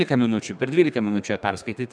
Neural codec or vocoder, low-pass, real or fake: codec, 32 kHz, 1.9 kbps, SNAC; 9.9 kHz; fake